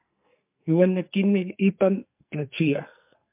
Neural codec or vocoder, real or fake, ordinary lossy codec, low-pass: codec, 44.1 kHz, 2.6 kbps, SNAC; fake; MP3, 32 kbps; 3.6 kHz